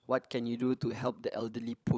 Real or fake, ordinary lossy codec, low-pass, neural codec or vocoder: fake; none; none; codec, 16 kHz, 16 kbps, FunCodec, trained on LibriTTS, 50 frames a second